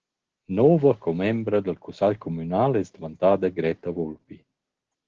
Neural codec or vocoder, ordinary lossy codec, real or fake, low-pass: none; Opus, 16 kbps; real; 7.2 kHz